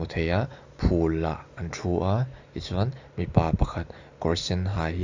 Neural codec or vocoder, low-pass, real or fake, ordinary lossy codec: none; 7.2 kHz; real; AAC, 48 kbps